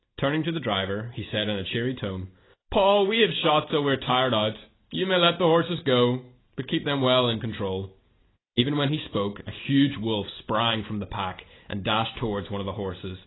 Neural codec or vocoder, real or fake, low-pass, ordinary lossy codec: none; real; 7.2 kHz; AAC, 16 kbps